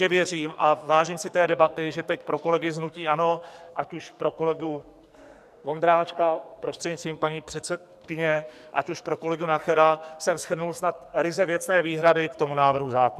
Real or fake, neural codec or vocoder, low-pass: fake; codec, 44.1 kHz, 2.6 kbps, SNAC; 14.4 kHz